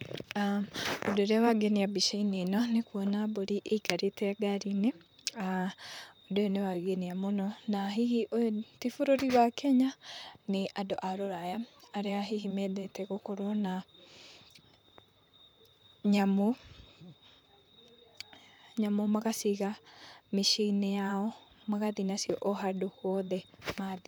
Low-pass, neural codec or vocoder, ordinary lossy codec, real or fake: none; vocoder, 44.1 kHz, 128 mel bands, Pupu-Vocoder; none; fake